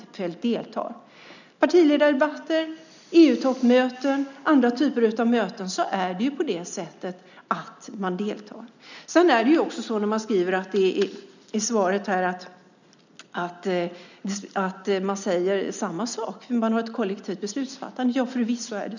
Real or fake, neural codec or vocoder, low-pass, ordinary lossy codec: real; none; 7.2 kHz; none